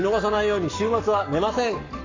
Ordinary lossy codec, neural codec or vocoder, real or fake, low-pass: AAC, 48 kbps; codec, 44.1 kHz, 7.8 kbps, Pupu-Codec; fake; 7.2 kHz